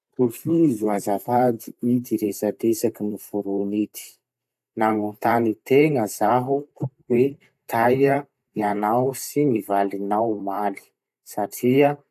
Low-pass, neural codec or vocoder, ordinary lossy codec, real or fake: 14.4 kHz; vocoder, 44.1 kHz, 128 mel bands every 512 samples, BigVGAN v2; AAC, 96 kbps; fake